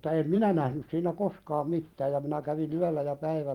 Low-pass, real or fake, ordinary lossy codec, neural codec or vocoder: 19.8 kHz; fake; Opus, 24 kbps; vocoder, 44.1 kHz, 128 mel bands every 512 samples, BigVGAN v2